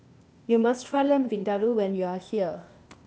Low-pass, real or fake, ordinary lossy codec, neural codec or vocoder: none; fake; none; codec, 16 kHz, 0.8 kbps, ZipCodec